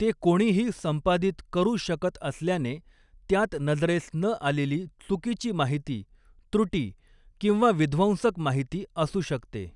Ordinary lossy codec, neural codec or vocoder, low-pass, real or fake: none; none; 10.8 kHz; real